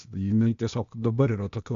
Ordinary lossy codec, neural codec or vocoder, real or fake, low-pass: MP3, 48 kbps; codec, 16 kHz, 0.8 kbps, ZipCodec; fake; 7.2 kHz